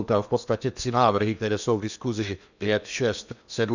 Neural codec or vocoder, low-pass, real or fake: codec, 16 kHz in and 24 kHz out, 0.8 kbps, FocalCodec, streaming, 65536 codes; 7.2 kHz; fake